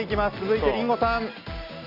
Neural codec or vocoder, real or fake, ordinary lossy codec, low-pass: none; real; AAC, 48 kbps; 5.4 kHz